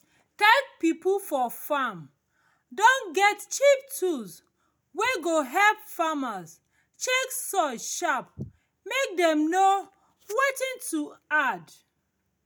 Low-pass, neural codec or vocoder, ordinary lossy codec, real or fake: none; none; none; real